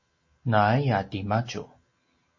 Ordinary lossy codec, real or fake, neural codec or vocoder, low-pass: MP3, 32 kbps; real; none; 7.2 kHz